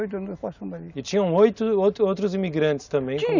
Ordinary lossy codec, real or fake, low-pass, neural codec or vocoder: none; real; 7.2 kHz; none